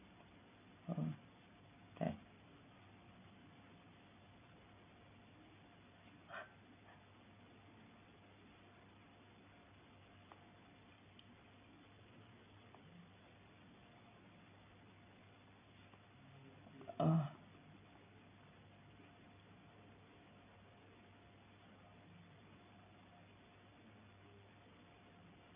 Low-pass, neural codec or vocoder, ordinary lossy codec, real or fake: 3.6 kHz; none; none; real